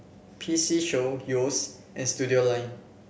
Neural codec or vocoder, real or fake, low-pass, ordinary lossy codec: none; real; none; none